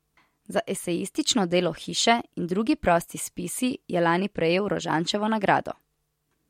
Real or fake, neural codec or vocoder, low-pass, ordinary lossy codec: real; none; 19.8 kHz; MP3, 64 kbps